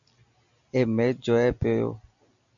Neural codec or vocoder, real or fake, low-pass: none; real; 7.2 kHz